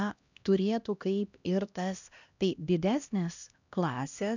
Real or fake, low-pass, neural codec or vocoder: fake; 7.2 kHz; codec, 16 kHz, 1 kbps, X-Codec, HuBERT features, trained on LibriSpeech